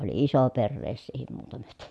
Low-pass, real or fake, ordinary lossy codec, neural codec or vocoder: none; real; none; none